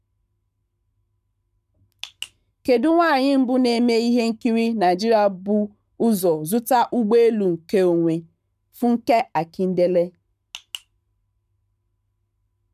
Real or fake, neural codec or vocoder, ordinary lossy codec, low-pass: fake; codec, 44.1 kHz, 7.8 kbps, Pupu-Codec; none; 14.4 kHz